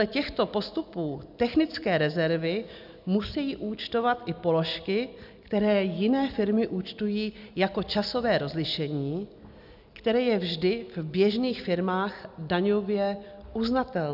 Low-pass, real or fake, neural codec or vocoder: 5.4 kHz; real; none